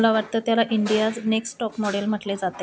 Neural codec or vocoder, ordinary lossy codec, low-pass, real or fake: none; none; none; real